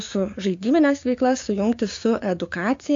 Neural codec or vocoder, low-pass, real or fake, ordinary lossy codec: codec, 16 kHz, 6 kbps, DAC; 7.2 kHz; fake; AAC, 48 kbps